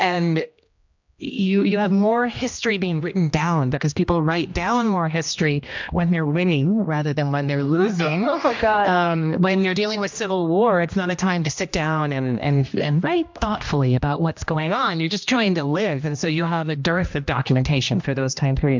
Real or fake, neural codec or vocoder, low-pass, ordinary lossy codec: fake; codec, 16 kHz, 1 kbps, X-Codec, HuBERT features, trained on general audio; 7.2 kHz; MP3, 64 kbps